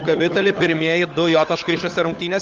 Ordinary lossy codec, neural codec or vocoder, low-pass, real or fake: Opus, 32 kbps; codec, 16 kHz, 16 kbps, FunCodec, trained on LibriTTS, 50 frames a second; 7.2 kHz; fake